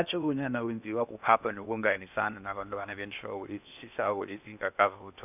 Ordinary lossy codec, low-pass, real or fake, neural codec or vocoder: none; 3.6 kHz; fake; codec, 16 kHz in and 24 kHz out, 0.8 kbps, FocalCodec, streaming, 65536 codes